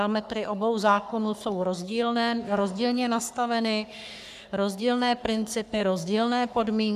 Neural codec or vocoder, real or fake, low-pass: codec, 44.1 kHz, 3.4 kbps, Pupu-Codec; fake; 14.4 kHz